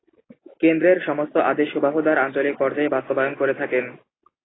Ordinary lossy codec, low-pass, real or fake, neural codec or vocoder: AAC, 16 kbps; 7.2 kHz; real; none